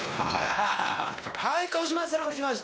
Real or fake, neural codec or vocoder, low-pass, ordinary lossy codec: fake; codec, 16 kHz, 1 kbps, X-Codec, WavLM features, trained on Multilingual LibriSpeech; none; none